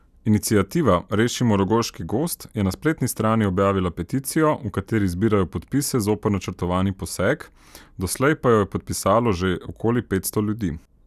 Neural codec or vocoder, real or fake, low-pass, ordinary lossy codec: none; real; 14.4 kHz; none